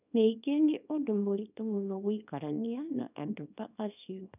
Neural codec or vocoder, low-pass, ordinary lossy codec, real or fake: codec, 24 kHz, 0.9 kbps, WavTokenizer, small release; 3.6 kHz; none; fake